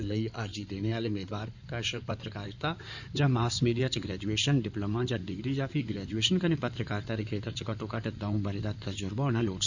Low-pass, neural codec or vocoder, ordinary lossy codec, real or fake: 7.2 kHz; codec, 16 kHz in and 24 kHz out, 2.2 kbps, FireRedTTS-2 codec; none; fake